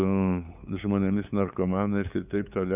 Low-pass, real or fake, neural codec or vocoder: 3.6 kHz; fake; codec, 16 kHz, 8 kbps, FunCodec, trained on LibriTTS, 25 frames a second